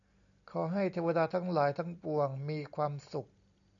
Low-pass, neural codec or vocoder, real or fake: 7.2 kHz; none; real